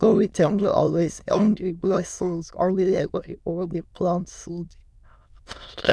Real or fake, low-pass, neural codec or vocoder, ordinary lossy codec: fake; none; autoencoder, 22.05 kHz, a latent of 192 numbers a frame, VITS, trained on many speakers; none